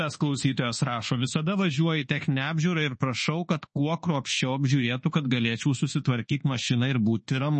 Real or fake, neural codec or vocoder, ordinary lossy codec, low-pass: fake; codec, 24 kHz, 1.2 kbps, DualCodec; MP3, 32 kbps; 10.8 kHz